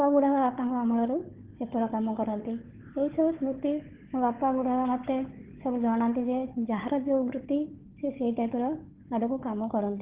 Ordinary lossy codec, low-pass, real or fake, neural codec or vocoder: Opus, 16 kbps; 3.6 kHz; fake; codec, 16 kHz, 4 kbps, FunCodec, trained on Chinese and English, 50 frames a second